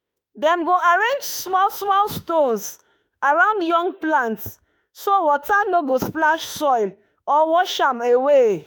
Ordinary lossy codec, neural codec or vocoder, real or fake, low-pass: none; autoencoder, 48 kHz, 32 numbers a frame, DAC-VAE, trained on Japanese speech; fake; none